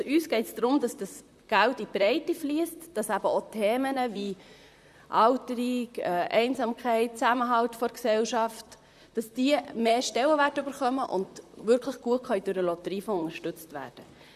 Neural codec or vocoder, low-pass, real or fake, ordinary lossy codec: vocoder, 44.1 kHz, 128 mel bands, Pupu-Vocoder; 14.4 kHz; fake; none